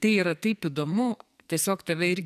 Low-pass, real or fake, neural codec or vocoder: 14.4 kHz; fake; codec, 44.1 kHz, 2.6 kbps, SNAC